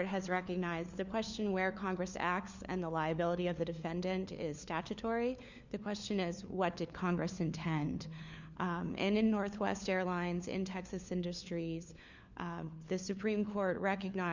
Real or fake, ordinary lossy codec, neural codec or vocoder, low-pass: fake; Opus, 64 kbps; codec, 16 kHz, 4 kbps, FunCodec, trained on LibriTTS, 50 frames a second; 7.2 kHz